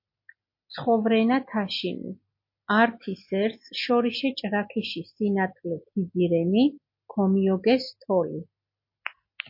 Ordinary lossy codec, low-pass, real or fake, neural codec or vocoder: MP3, 32 kbps; 5.4 kHz; real; none